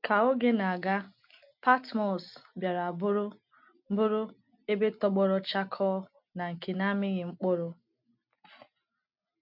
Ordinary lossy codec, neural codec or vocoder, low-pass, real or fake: MP3, 48 kbps; none; 5.4 kHz; real